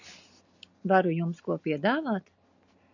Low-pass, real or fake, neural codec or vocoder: 7.2 kHz; real; none